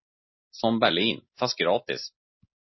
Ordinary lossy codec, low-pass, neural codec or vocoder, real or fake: MP3, 24 kbps; 7.2 kHz; none; real